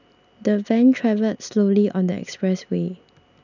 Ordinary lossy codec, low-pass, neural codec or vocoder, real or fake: none; 7.2 kHz; none; real